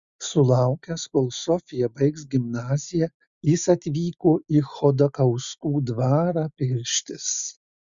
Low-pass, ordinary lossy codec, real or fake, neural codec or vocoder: 7.2 kHz; Opus, 64 kbps; real; none